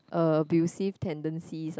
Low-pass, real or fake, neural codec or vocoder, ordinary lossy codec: none; real; none; none